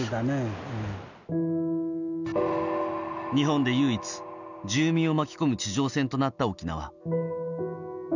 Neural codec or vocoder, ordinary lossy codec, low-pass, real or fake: none; none; 7.2 kHz; real